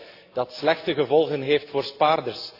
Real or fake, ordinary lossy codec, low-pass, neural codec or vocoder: real; AAC, 32 kbps; 5.4 kHz; none